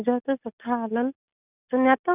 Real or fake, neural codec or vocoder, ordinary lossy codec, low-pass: real; none; none; 3.6 kHz